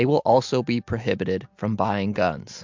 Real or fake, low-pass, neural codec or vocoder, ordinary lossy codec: real; 7.2 kHz; none; MP3, 64 kbps